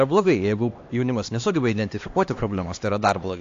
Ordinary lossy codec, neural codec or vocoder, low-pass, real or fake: AAC, 48 kbps; codec, 16 kHz, 2 kbps, X-Codec, HuBERT features, trained on LibriSpeech; 7.2 kHz; fake